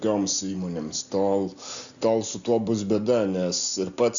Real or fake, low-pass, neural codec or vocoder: real; 7.2 kHz; none